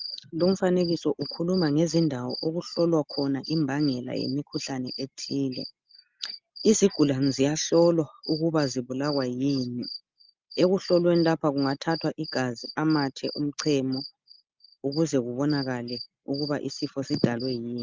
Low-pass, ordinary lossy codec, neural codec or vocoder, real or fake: 7.2 kHz; Opus, 32 kbps; none; real